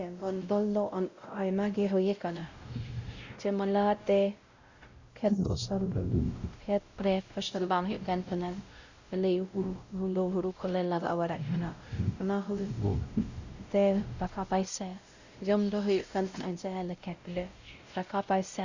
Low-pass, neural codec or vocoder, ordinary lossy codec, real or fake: 7.2 kHz; codec, 16 kHz, 0.5 kbps, X-Codec, WavLM features, trained on Multilingual LibriSpeech; Opus, 64 kbps; fake